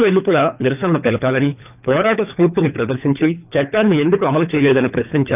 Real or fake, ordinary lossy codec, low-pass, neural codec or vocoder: fake; none; 3.6 kHz; codec, 24 kHz, 3 kbps, HILCodec